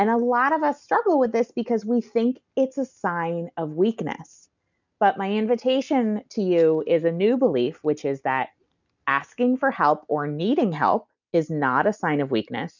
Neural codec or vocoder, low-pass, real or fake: none; 7.2 kHz; real